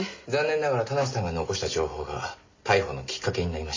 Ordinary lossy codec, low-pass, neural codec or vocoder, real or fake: MP3, 32 kbps; 7.2 kHz; none; real